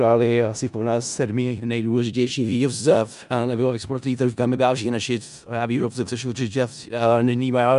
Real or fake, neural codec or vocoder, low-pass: fake; codec, 16 kHz in and 24 kHz out, 0.4 kbps, LongCat-Audio-Codec, four codebook decoder; 10.8 kHz